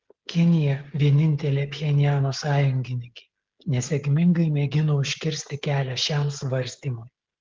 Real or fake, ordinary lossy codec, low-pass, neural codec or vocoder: fake; Opus, 16 kbps; 7.2 kHz; codec, 16 kHz, 16 kbps, FreqCodec, smaller model